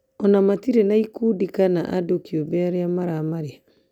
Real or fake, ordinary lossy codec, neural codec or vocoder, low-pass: real; none; none; 19.8 kHz